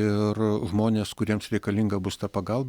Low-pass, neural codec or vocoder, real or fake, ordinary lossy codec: 19.8 kHz; none; real; Opus, 64 kbps